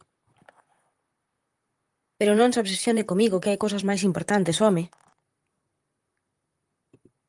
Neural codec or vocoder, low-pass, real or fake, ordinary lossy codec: vocoder, 44.1 kHz, 128 mel bands, Pupu-Vocoder; 10.8 kHz; fake; Opus, 32 kbps